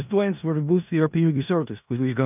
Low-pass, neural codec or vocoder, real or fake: 3.6 kHz; codec, 16 kHz in and 24 kHz out, 0.4 kbps, LongCat-Audio-Codec, four codebook decoder; fake